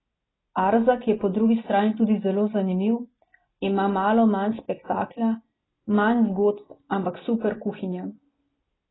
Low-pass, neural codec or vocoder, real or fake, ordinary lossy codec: 7.2 kHz; none; real; AAC, 16 kbps